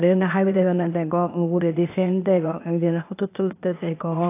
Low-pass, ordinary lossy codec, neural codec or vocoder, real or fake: 3.6 kHz; AAC, 24 kbps; codec, 16 kHz, 0.8 kbps, ZipCodec; fake